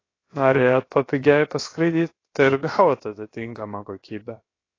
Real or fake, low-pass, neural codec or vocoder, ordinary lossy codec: fake; 7.2 kHz; codec, 16 kHz, about 1 kbps, DyCAST, with the encoder's durations; AAC, 32 kbps